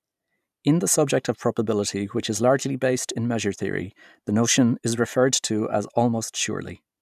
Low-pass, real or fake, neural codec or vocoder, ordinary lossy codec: 14.4 kHz; fake; vocoder, 44.1 kHz, 128 mel bands every 512 samples, BigVGAN v2; none